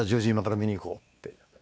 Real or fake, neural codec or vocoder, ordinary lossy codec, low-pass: fake; codec, 16 kHz, 2 kbps, X-Codec, WavLM features, trained on Multilingual LibriSpeech; none; none